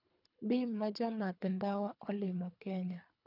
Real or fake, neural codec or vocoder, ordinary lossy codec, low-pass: fake; codec, 24 kHz, 3 kbps, HILCodec; none; 5.4 kHz